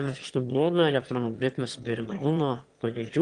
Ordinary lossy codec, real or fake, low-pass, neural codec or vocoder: Opus, 24 kbps; fake; 9.9 kHz; autoencoder, 22.05 kHz, a latent of 192 numbers a frame, VITS, trained on one speaker